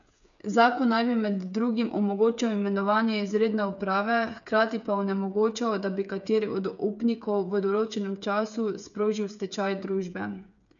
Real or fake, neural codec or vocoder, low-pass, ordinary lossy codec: fake; codec, 16 kHz, 8 kbps, FreqCodec, smaller model; 7.2 kHz; none